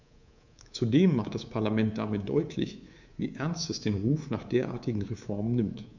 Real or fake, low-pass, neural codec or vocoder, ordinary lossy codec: fake; 7.2 kHz; codec, 24 kHz, 3.1 kbps, DualCodec; none